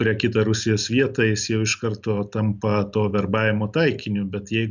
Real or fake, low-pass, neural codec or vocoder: real; 7.2 kHz; none